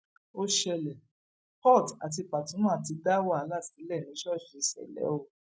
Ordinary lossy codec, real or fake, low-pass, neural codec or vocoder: none; real; none; none